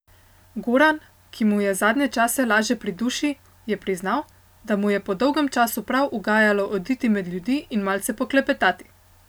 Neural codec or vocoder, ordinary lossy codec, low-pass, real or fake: none; none; none; real